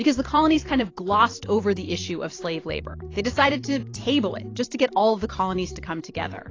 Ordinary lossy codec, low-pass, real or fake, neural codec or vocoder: AAC, 32 kbps; 7.2 kHz; fake; vocoder, 44.1 kHz, 128 mel bands every 512 samples, BigVGAN v2